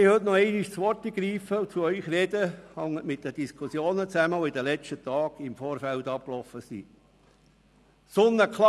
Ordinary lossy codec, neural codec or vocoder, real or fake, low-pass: none; none; real; none